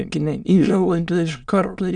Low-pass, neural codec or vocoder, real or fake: 9.9 kHz; autoencoder, 22.05 kHz, a latent of 192 numbers a frame, VITS, trained on many speakers; fake